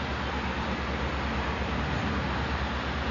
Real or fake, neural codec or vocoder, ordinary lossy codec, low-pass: real; none; Opus, 64 kbps; 7.2 kHz